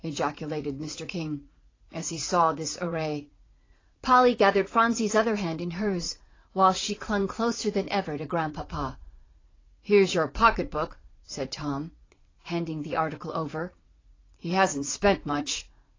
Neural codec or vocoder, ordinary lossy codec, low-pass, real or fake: vocoder, 44.1 kHz, 80 mel bands, Vocos; AAC, 32 kbps; 7.2 kHz; fake